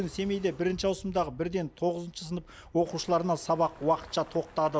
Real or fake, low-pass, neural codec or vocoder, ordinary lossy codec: real; none; none; none